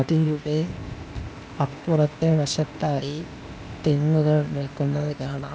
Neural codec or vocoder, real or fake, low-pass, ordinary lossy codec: codec, 16 kHz, 0.8 kbps, ZipCodec; fake; none; none